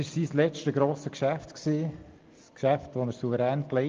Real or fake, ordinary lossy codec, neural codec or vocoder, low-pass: real; Opus, 32 kbps; none; 7.2 kHz